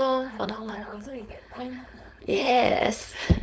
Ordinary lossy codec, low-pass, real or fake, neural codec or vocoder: none; none; fake; codec, 16 kHz, 4.8 kbps, FACodec